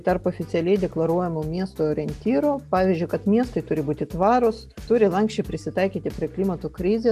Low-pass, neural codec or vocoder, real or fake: 14.4 kHz; none; real